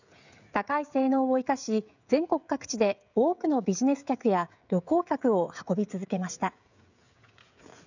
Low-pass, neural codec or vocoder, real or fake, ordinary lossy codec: 7.2 kHz; codec, 16 kHz, 16 kbps, FreqCodec, smaller model; fake; none